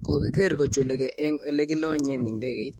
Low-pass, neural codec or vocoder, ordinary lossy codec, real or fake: 19.8 kHz; autoencoder, 48 kHz, 32 numbers a frame, DAC-VAE, trained on Japanese speech; MP3, 64 kbps; fake